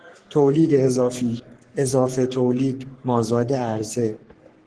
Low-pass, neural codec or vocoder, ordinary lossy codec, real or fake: 10.8 kHz; codec, 44.1 kHz, 3.4 kbps, Pupu-Codec; Opus, 16 kbps; fake